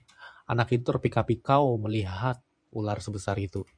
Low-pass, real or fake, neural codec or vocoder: 9.9 kHz; fake; vocoder, 24 kHz, 100 mel bands, Vocos